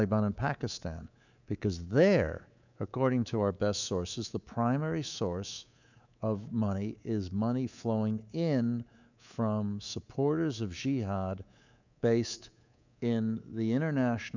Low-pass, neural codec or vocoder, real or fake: 7.2 kHz; codec, 24 kHz, 3.1 kbps, DualCodec; fake